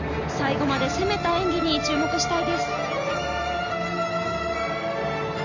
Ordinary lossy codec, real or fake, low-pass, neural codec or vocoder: none; real; 7.2 kHz; none